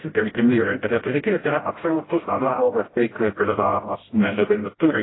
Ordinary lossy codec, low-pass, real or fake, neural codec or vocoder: AAC, 16 kbps; 7.2 kHz; fake; codec, 16 kHz, 0.5 kbps, FreqCodec, smaller model